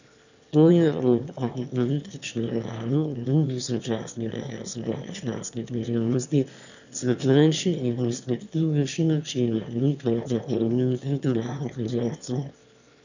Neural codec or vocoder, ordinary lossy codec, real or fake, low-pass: autoencoder, 22.05 kHz, a latent of 192 numbers a frame, VITS, trained on one speaker; none; fake; 7.2 kHz